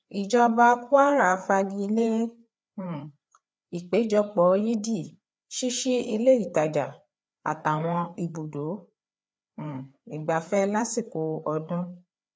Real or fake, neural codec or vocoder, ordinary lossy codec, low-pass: fake; codec, 16 kHz, 4 kbps, FreqCodec, larger model; none; none